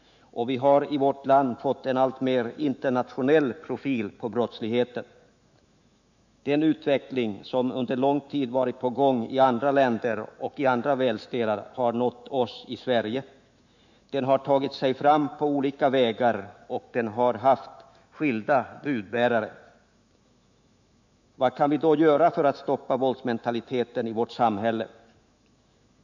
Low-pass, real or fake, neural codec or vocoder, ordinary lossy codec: 7.2 kHz; fake; autoencoder, 48 kHz, 128 numbers a frame, DAC-VAE, trained on Japanese speech; none